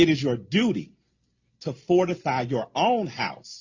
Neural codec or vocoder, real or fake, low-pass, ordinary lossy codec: none; real; 7.2 kHz; Opus, 64 kbps